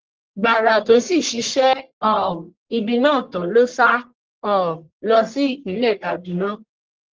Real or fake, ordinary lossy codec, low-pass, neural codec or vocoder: fake; Opus, 16 kbps; 7.2 kHz; codec, 44.1 kHz, 1.7 kbps, Pupu-Codec